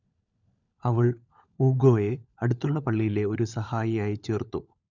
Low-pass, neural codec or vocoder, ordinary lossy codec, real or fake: 7.2 kHz; codec, 16 kHz, 16 kbps, FunCodec, trained on LibriTTS, 50 frames a second; none; fake